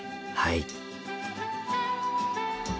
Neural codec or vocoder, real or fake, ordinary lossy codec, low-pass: none; real; none; none